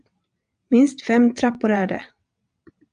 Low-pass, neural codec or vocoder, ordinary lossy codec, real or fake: 9.9 kHz; vocoder, 22.05 kHz, 80 mel bands, WaveNeXt; AAC, 96 kbps; fake